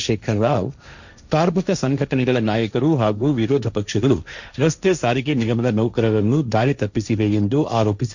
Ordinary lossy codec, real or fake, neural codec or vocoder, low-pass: none; fake; codec, 16 kHz, 1.1 kbps, Voila-Tokenizer; 7.2 kHz